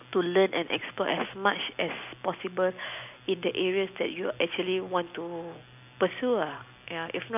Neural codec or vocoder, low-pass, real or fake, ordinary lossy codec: none; 3.6 kHz; real; none